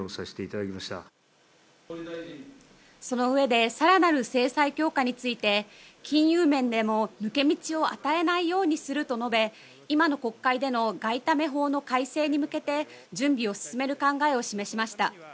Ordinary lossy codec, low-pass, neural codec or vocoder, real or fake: none; none; none; real